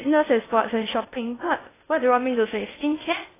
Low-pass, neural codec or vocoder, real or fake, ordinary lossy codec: 3.6 kHz; codec, 16 kHz in and 24 kHz out, 0.6 kbps, FocalCodec, streaming, 2048 codes; fake; AAC, 16 kbps